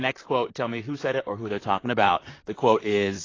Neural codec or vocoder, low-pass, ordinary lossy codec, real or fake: vocoder, 44.1 kHz, 128 mel bands, Pupu-Vocoder; 7.2 kHz; AAC, 32 kbps; fake